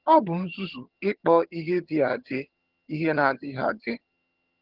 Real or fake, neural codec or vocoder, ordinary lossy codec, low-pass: fake; vocoder, 22.05 kHz, 80 mel bands, HiFi-GAN; Opus, 16 kbps; 5.4 kHz